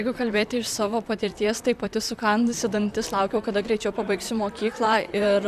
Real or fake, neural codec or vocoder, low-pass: fake; vocoder, 44.1 kHz, 128 mel bands, Pupu-Vocoder; 14.4 kHz